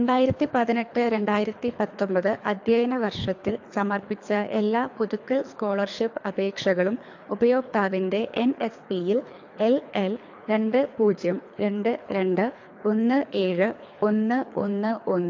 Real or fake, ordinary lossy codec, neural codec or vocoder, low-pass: fake; AAC, 48 kbps; codec, 24 kHz, 3 kbps, HILCodec; 7.2 kHz